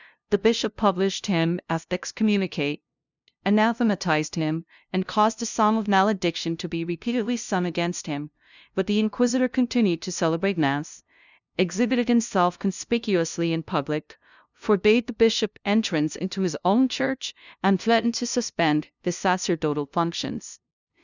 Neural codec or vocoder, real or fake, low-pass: codec, 16 kHz, 0.5 kbps, FunCodec, trained on LibriTTS, 25 frames a second; fake; 7.2 kHz